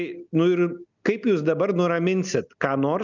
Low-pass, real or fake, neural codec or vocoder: 7.2 kHz; real; none